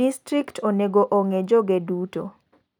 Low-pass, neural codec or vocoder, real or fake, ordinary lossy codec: 19.8 kHz; none; real; none